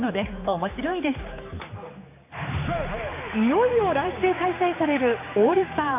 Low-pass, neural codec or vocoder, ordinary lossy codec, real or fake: 3.6 kHz; codec, 16 kHz, 4 kbps, X-Codec, HuBERT features, trained on general audio; AAC, 24 kbps; fake